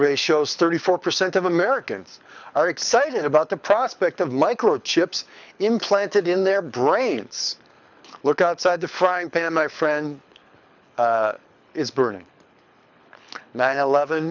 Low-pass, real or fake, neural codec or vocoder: 7.2 kHz; fake; codec, 24 kHz, 6 kbps, HILCodec